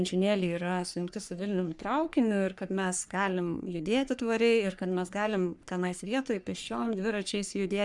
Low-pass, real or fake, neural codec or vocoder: 10.8 kHz; fake; codec, 44.1 kHz, 3.4 kbps, Pupu-Codec